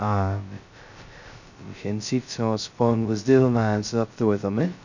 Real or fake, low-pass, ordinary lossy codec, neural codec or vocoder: fake; 7.2 kHz; none; codec, 16 kHz, 0.2 kbps, FocalCodec